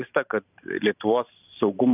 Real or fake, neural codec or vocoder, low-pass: fake; vocoder, 44.1 kHz, 128 mel bands every 512 samples, BigVGAN v2; 3.6 kHz